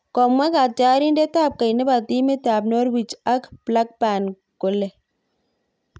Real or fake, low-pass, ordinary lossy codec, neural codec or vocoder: real; none; none; none